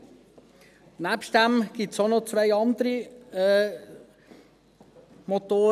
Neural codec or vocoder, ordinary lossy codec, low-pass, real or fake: none; none; 14.4 kHz; real